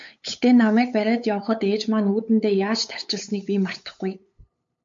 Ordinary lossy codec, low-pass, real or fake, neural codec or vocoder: MP3, 48 kbps; 7.2 kHz; fake; codec, 16 kHz, 8 kbps, FunCodec, trained on LibriTTS, 25 frames a second